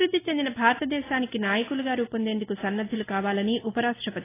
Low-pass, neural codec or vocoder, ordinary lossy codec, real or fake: 3.6 kHz; none; AAC, 16 kbps; real